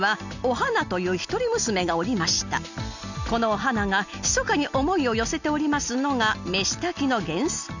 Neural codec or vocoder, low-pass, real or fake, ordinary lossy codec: none; 7.2 kHz; real; none